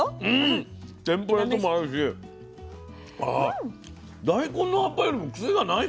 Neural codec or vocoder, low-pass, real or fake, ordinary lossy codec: none; none; real; none